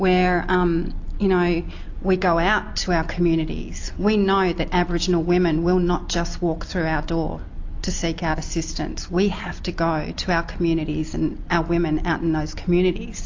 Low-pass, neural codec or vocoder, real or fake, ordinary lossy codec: 7.2 kHz; none; real; AAC, 48 kbps